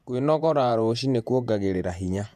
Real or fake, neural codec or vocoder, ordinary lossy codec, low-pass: fake; vocoder, 48 kHz, 128 mel bands, Vocos; none; 14.4 kHz